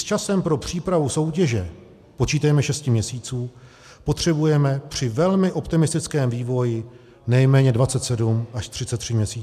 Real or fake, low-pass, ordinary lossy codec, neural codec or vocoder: real; 14.4 kHz; MP3, 96 kbps; none